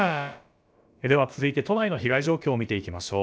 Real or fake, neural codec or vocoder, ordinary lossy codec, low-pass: fake; codec, 16 kHz, about 1 kbps, DyCAST, with the encoder's durations; none; none